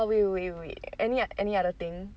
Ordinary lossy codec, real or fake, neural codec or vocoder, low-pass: none; real; none; none